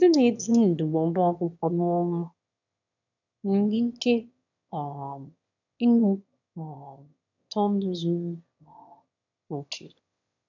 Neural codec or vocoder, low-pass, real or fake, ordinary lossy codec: autoencoder, 22.05 kHz, a latent of 192 numbers a frame, VITS, trained on one speaker; 7.2 kHz; fake; none